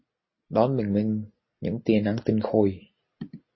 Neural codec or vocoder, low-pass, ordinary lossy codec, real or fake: none; 7.2 kHz; MP3, 24 kbps; real